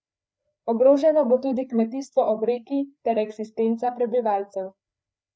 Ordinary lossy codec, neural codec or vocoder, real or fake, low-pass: none; codec, 16 kHz, 4 kbps, FreqCodec, larger model; fake; none